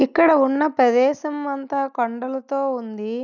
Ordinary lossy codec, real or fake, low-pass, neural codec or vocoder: none; real; 7.2 kHz; none